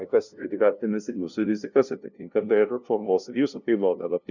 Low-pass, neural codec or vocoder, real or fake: 7.2 kHz; codec, 16 kHz, 0.5 kbps, FunCodec, trained on LibriTTS, 25 frames a second; fake